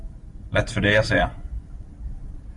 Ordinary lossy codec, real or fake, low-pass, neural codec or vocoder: MP3, 48 kbps; fake; 10.8 kHz; vocoder, 44.1 kHz, 128 mel bands every 512 samples, BigVGAN v2